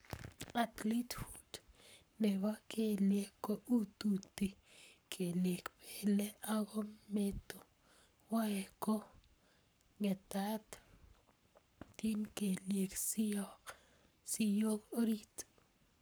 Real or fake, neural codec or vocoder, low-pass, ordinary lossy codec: fake; codec, 44.1 kHz, 7.8 kbps, Pupu-Codec; none; none